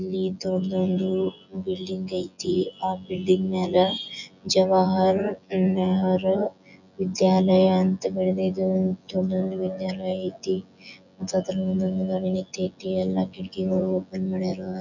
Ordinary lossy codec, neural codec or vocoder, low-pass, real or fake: none; vocoder, 24 kHz, 100 mel bands, Vocos; 7.2 kHz; fake